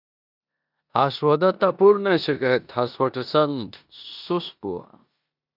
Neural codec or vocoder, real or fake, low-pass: codec, 16 kHz in and 24 kHz out, 0.9 kbps, LongCat-Audio-Codec, four codebook decoder; fake; 5.4 kHz